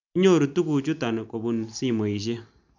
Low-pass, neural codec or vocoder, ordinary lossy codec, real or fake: 7.2 kHz; none; MP3, 64 kbps; real